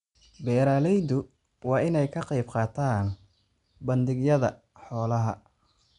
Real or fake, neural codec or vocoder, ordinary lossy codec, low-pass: real; none; none; 10.8 kHz